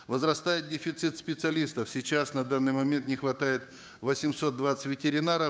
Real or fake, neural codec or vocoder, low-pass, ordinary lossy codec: fake; codec, 16 kHz, 6 kbps, DAC; none; none